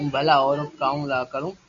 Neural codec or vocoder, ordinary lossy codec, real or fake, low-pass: none; Opus, 64 kbps; real; 7.2 kHz